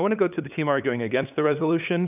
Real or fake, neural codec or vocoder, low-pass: fake; codec, 16 kHz, 8 kbps, FunCodec, trained on LibriTTS, 25 frames a second; 3.6 kHz